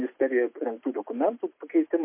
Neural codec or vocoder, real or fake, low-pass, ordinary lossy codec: none; real; 3.6 kHz; MP3, 24 kbps